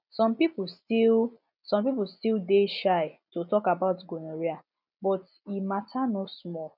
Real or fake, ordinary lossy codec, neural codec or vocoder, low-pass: real; none; none; 5.4 kHz